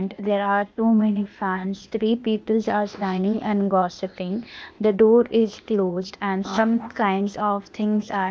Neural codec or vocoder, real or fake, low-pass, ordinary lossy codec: codec, 16 kHz, 0.8 kbps, ZipCodec; fake; none; none